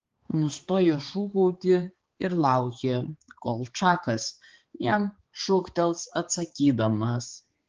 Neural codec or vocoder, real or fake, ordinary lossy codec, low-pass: codec, 16 kHz, 4 kbps, X-Codec, HuBERT features, trained on balanced general audio; fake; Opus, 16 kbps; 7.2 kHz